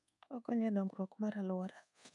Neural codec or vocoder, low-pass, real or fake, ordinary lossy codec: codec, 24 kHz, 1.2 kbps, DualCodec; 10.8 kHz; fake; none